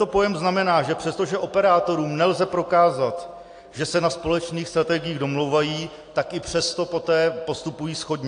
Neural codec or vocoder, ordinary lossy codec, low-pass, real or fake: none; AAC, 48 kbps; 9.9 kHz; real